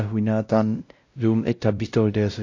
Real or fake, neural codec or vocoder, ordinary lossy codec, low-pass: fake; codec, 16 kHz, 0.5 kbps, X-Codec, WavLM features, trained on Multilingual LibriSpeech; AAC, 48 kbps; 7.2 kHz